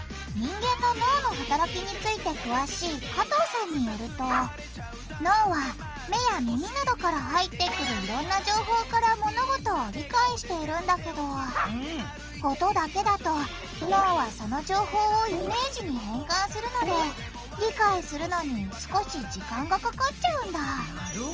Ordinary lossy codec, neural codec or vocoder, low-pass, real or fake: Opus, 24 kbps; none; 7.2 kHz; real